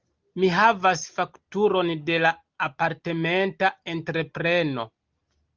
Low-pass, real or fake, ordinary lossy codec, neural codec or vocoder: 7.2 kHz; real; Opus, 32 kbps; none